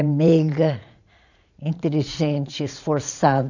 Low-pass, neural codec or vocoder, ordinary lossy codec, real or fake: 7.2 kHz; vocoder, 44.1 kHz, 80 mel bands, Vocos; none; fake